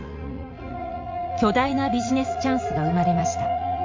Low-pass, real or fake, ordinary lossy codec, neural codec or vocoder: 7.2 kHz; fake; MP3, 48 kbps; vocoder, 44.1 kHz, 80 mel bands, Vocos